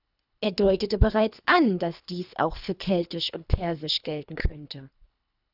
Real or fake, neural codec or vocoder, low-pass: fake; codec, 24 kHz, 3 kbps, HILCodec; 5.4 kHz